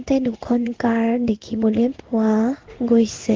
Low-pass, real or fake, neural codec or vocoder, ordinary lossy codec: 7.2 kHz; fake; codec, 16 kHz in and 24 kHz out, 1 kbps, XY-Tokenizer; Opus, 16 kbps